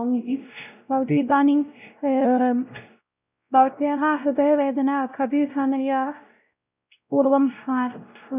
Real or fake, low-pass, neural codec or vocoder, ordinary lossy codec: fake; 3.6 kHz; codec, 16 kHz, 0.5 kbps, X-Codec, WavLM features, trained on Multilingual LibriSpeech; none